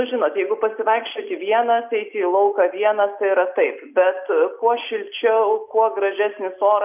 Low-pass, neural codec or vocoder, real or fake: 3.6 kHz; none; real